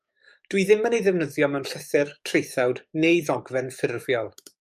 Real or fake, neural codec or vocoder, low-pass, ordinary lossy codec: fake; codec, 44.1 kHz, 7.8 kbps, DAC; 14.4 kHz; MP3, 96 kbps